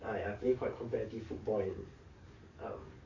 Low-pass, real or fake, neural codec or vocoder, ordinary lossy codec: 7.2 kHz; fake; codec, 44.1 kHz, 7.8 kbps, DAC; AAC, 48 kbps